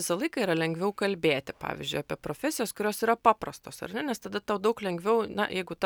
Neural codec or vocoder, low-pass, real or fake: none; 19.8 kHz; real